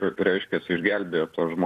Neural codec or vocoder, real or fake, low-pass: vocoder, 44.1 kHz, 128 mel bands every 256 samples, BigVGAN v2; fake; 14.4 kHz